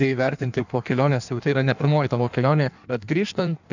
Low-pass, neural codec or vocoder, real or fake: 7.2 kHz; codec, 16 kHz in and 24 kHz out, 1.1 kbps, FireRedTTS-2 codec; fake